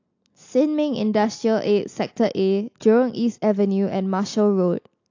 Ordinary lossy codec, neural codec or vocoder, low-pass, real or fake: AAC, 48 kbps; none; 7.2 kHz; real